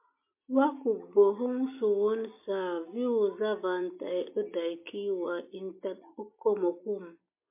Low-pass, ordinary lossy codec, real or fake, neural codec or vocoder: 3.6 kHz; MP3, 24 kbps; real; none